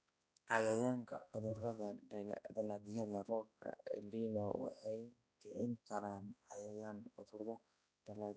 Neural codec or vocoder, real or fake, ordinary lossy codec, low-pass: codec, 16 kHz, 1 kbps, X-Codec, HuBERT features, trained on balanced general audio; fake; none; none